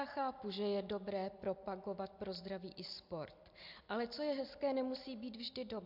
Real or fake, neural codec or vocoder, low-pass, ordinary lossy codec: real; none; 5.4 kHz; MP3, 48 kbps